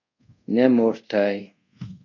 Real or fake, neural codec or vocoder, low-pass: fake; codec, 24 kHz, 0.5 kbps, DualCodec; 7.2 kHz